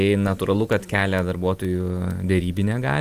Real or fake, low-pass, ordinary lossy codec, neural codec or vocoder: real; 14.4 kHz; Opus, 32 kbps; none